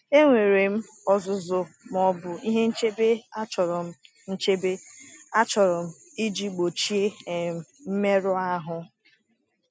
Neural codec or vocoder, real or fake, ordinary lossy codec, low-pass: none; real; none; none